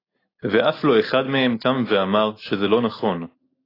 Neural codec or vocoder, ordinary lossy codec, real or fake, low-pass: none; AAC, 24 kbps; real; 5.4 kHz